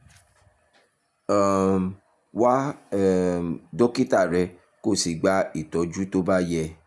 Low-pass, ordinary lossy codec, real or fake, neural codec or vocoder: none; none; real; none